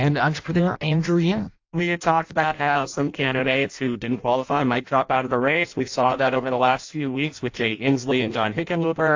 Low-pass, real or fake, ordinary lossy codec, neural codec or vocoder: 7.2 kHz; fake; AAC, 48 kbps; codec, 16 kHz in and 24 kHz out, 0.6 kbps, FireRedTTS-2 codec